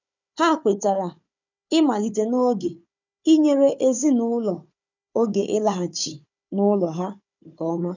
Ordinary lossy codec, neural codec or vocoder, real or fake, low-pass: none; codec, 16 kHz, 4 kbps, FunCodec, trained on Chinese and English, 50 frames a second; fake; 7.2 kHz